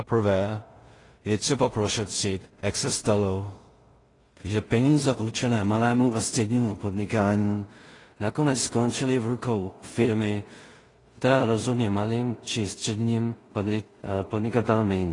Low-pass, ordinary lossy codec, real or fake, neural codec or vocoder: 10.8 kHz; AAC, 32 kbps; fake; codec, 16 kHz in and 24 kHz out, 0.4 kbps, LongCat-Audio-Codec, two codebook decoder